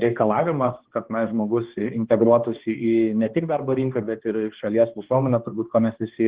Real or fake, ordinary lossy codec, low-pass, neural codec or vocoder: fake; Opus, 16 kbps; 3.6 kHz; codec, 16 kHz, 2 kbps, X-Codec, HuBERT features, trained on balanced general audio